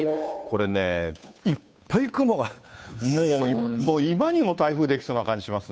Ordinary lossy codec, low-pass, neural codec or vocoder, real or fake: none; none; codec, 16 kHz, 2 kbps, FunCodec, trained on Chinese and English, 25 frames a second; fake